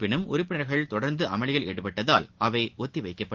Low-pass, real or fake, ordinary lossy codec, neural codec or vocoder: 7.2 kHz; real; Opus, 16 kbps; none